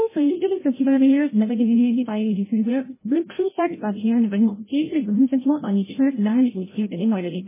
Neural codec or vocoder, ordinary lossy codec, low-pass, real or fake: codec, 16 kHz, 0.5 kbps, FreqCodec, larger model; MP3, 16 kbps; 3.6 kHz; fake